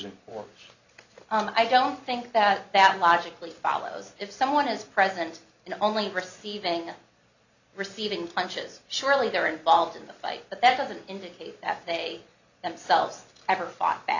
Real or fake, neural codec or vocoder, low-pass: real; none; 7.2 kHz